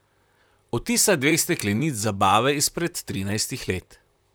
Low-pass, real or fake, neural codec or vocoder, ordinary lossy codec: none; fake; vocoder, 44.1 kHz, 128 mel bands, Pupu-Vocoder; none